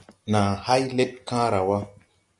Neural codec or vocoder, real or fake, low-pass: none; real; 10.8 kHz